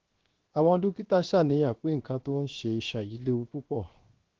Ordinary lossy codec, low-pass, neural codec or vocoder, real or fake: Opus, 16 kbps; 7.2 kHz; codec, 16 kHz, 0.7 kbps, FocalCodec; fake